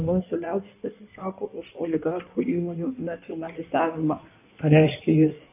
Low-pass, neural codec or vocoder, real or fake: 3.6 kHz; codec, 16 kHz in and 24 kHz out, 1.1 kbps, FireRedTTS-2 codec; fake